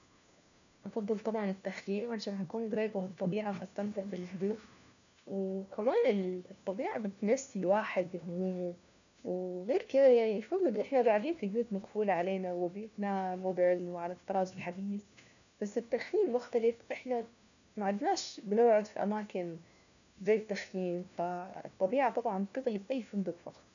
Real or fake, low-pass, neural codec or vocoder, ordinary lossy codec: fake; 7.2 kHz; codec, 16 kHz, 1 kbps, FunCodec, trained on LibriTTS, 50 frames a second; none